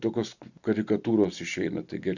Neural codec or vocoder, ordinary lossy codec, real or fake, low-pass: none; Opus, 64 kbps; real; 7.2 kHz